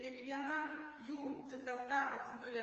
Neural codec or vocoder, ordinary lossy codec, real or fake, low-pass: codec, 16 kHz, 2 kbps, FreqCodec, larger model; Opus, 24 kbps; fake; 7.2 kHz